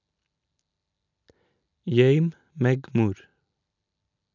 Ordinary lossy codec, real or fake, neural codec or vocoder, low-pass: none; real; none; 7.2 kHz